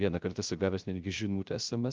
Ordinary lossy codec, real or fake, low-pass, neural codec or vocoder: Opus, 32 kbps; fake; 7.2 kHz; codec, 16 kHz, 0.3 kbps, FocalCodec